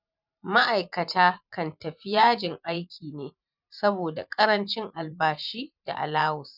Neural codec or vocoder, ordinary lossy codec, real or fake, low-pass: vocoder, 44.1 kHz, 128 mel bands every 512 samples, BigVGAN v2; none; fake; 5.4 kHz